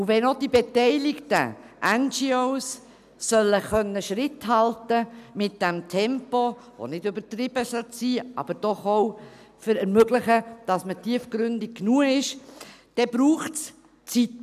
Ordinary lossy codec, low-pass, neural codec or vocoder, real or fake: none; 14.4 kHz; none; real